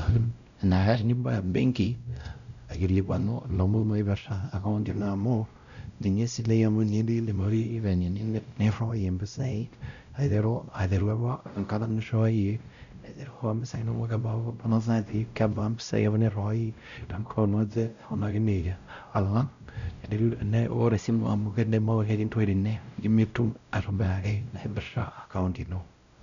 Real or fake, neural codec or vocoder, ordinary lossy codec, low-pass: fake; codec, 16 kHz, 0.5 kbps, X-Codec, WavLM features, trained on Multilingual LibriSpeech; none; 7.2 kHz